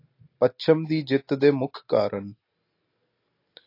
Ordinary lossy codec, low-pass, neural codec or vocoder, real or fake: AAC, 32 kbps; 5.4 kHz; none; real